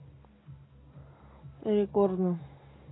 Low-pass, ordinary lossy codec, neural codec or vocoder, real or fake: 7.2 kHz; AAC, 16 kbps; none; real